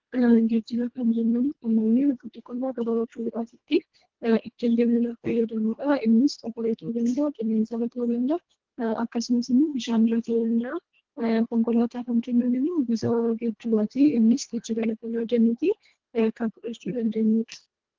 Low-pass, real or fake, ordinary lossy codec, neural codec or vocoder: 7.2 kHz; fake; Opus, 16 kbps; codec, 24 kHz, 1.5 kbps, HILCodec